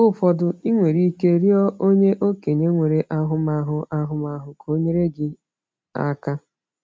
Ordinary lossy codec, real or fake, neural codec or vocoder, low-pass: none; real; none; none